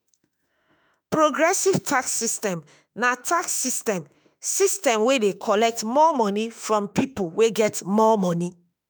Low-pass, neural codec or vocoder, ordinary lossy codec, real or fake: none; autoencoder, 48 kHz, 32 numbers a frame, DAC-VAE, trained on Japanese speech; none; fake